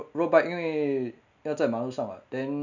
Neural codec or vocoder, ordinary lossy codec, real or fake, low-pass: none; none; real; 7.2 kHz